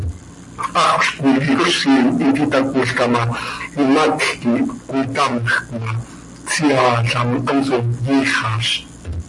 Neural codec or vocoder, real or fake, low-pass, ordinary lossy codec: none; real; 10.8 kHz; MP3, 48 kbps